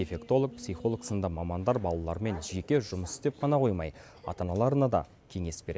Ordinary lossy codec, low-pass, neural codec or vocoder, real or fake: none; none; none; real